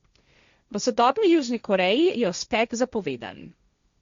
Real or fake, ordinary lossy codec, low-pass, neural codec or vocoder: fake; Opus, 64 kbps; 7.2 kHz; codec, 16 kHz, 1.1 kbps, Voila-Tokenizer